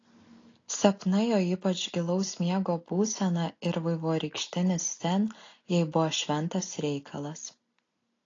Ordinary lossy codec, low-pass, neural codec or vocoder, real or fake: AAC, 32 kbps; 7.2 kHz; none; real